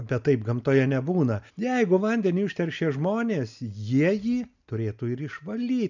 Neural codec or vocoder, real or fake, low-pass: none; real; 7.2 kHz